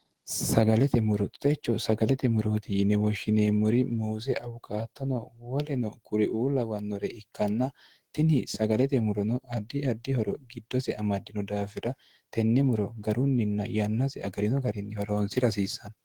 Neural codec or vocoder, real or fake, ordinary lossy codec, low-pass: autoencoder, 48 kHz, 128 numbers a frame, DAC-VAE, trained on Japanese speech; fake; Opus, 16 kbps; 19.8 kHz